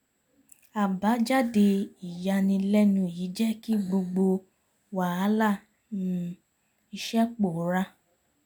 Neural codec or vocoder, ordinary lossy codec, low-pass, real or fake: none; none; none; real